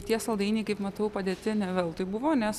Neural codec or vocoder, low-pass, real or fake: none; 14.4 kHz; real